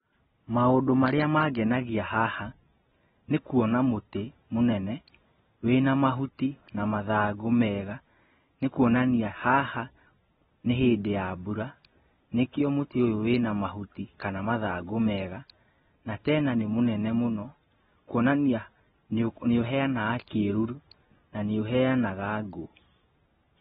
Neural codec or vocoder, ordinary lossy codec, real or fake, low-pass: none; AAC, 16 kbps; real; 19.8 kHz